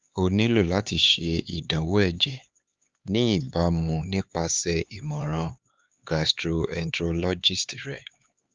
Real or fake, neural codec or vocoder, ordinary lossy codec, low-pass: fake; codec, 16 kHz, 4 kbps, X-Codec, HuBERT features, trained on LibriSpeech; Opus, 32 kbps; 7.2 kHz